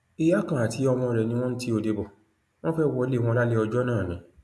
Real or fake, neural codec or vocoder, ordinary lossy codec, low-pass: real; none; none; none